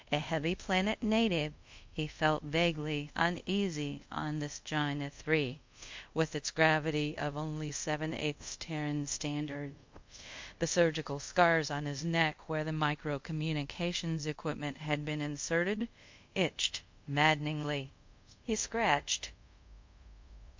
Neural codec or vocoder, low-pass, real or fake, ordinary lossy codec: codec, 24 kHz, 0.5 kbps, DualCodec; 7.2 kHz; fake; MP3, 48 kbps